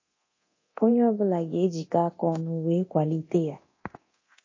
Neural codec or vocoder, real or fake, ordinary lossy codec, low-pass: codec, 24 kHz, 0.9 kbps, DualCodec; fake; MP3, 32 kbps; 7.2 kHz